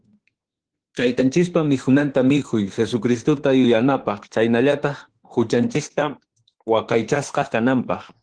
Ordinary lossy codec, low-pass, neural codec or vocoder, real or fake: Opus, 16 kbps; 9.9 kHz; autoencoder, 48 kHz, 32 numbers a frame, DAC-VAE, trained on Japanese speech; fake